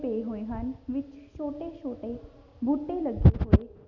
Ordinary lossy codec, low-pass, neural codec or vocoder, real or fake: none; 7.2 kHz; none; real